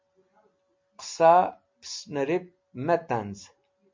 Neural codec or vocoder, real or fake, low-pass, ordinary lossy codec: none; real; 7.2 kHz; MP3, 48 kbps